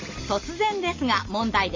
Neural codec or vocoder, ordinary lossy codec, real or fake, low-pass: none; MP3, 48 kbps; real; 7.2 kHz